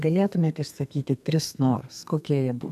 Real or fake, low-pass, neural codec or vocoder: fake; 14.4 kHz; codec, 32 kHz, 1.9 kbps, SNAC